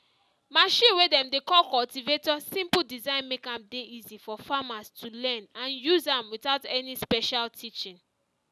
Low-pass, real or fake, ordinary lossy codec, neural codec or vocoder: none; real; none; none